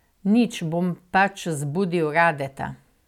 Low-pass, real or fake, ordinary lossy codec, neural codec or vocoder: 19.8 kHz; real; none; none